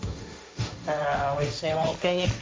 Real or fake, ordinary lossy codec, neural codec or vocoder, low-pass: fake; none; codec, 16 kHz, 1.1 kbps, Voila-Tokenizer; none